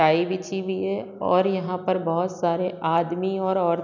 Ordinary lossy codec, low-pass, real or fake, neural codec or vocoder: none; 7.2 kHz; real; none